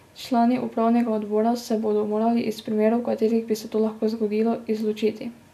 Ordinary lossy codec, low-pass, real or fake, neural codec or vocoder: none; 14.4 kHz; real; none